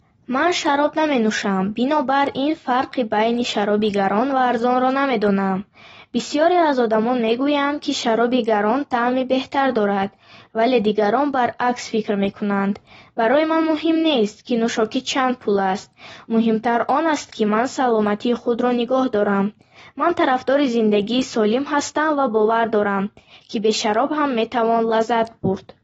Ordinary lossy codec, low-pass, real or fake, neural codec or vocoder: AAC, 24 kbps; 19.8 kHz; real; none